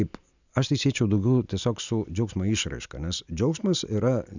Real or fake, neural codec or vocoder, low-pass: real; none; 7.2 kHz